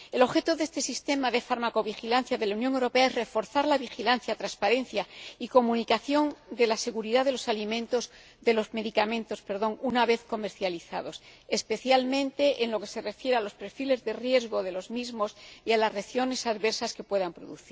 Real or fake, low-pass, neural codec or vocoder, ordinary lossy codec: real; none; none; none